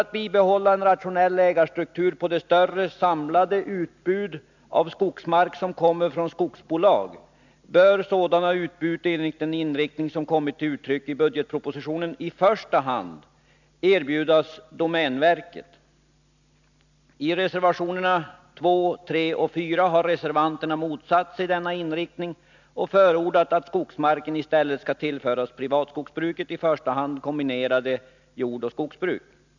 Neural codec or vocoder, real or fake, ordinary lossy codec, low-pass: none; real; none; 7.2 kHz